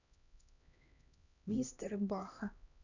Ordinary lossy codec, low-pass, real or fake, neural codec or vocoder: none; 7.2 kHz; fake; codec, 16 kHz, 1 kbps, X-Codec, HuBERT features, trained on LibriSpeech